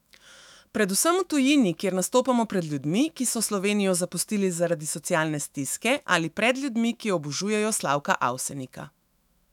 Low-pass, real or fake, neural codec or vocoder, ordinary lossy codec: 19.8 kHz; fake; autoencoder, 48 kHz, 128 numbers a frame, DAC-VAE, trained on Japanese speech; none